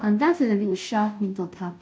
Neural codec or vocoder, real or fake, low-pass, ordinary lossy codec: codec, 16 kHz, 0.5 kbps, FunCodec, trained on Chinese and English, 25 frames a second; fake; none; none